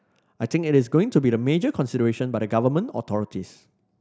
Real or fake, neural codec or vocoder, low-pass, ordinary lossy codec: real; none; none; none